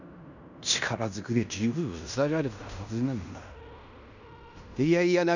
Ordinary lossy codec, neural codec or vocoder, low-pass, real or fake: none; codec, 16 kHz in and 24 kHz out, 0.9 kbps, LongCat-Audio-Codec, fine tuned four codebook decoder; 7.2 kHz; fake